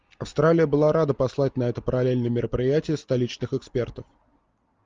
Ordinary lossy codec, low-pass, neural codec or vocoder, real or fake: Opus, 32 kbps; 7.2 kHz; none; real